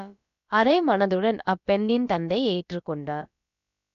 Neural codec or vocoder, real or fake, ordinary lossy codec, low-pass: codec, 16 kHz, about 1 kbps, DyCAST, with the encoder's durations; fake; none; 7.2 kHz